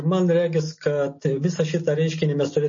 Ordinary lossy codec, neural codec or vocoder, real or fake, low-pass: MP3, 32 kbps; none; real; 7.2 kHz